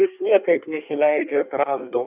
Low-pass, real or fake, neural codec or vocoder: 3.6 kHz; fake; codec, 24 kHz, 1 kbps, SNAC